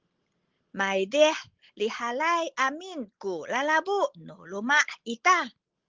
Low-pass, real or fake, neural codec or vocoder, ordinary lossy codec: 7.2 kHz; real; none; Opus, 16 kbps